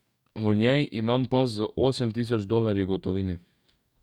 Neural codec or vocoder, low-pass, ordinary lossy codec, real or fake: codec, 44.1 kHz, 2.6 kbps, DAC; 19.8 kHz; none; fake